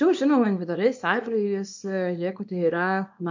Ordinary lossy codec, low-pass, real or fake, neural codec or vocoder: MP3, 48 kbps; 7.2 kHz; fake; codec, 24 kHz, 0.9 kbps, WavTokenizer, small release